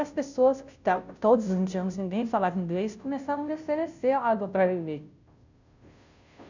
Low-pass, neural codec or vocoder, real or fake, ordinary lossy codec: 7.2 kHz; codec, 16 kHz, 0.5 kbps, FunCodec, trained on Chinese and English, 25 frames a second; fake; none